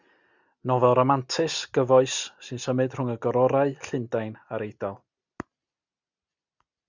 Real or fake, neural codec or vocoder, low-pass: real; none; 7.2 kHz